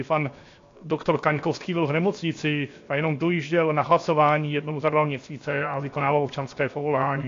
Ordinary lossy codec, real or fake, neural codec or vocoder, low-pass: AAC, 48 kbps; fake; codec, 16 kHz, 0.7 kbps, FocalCodec; 7.2 kHz